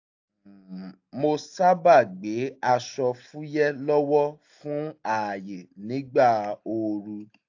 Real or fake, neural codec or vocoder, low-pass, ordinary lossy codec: real; none; 7.2 kHz; AAC, 48 kbps